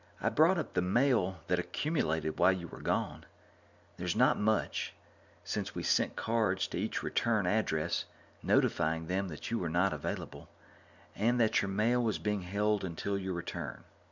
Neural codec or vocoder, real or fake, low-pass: none; real; 7.2 kHz